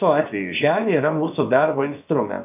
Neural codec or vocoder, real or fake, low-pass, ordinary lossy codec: codec, 16 kHz, about 1 kbps, DyCAST, with the encoder's durations; fake; 3.6 kHz; MP3, 32 kbps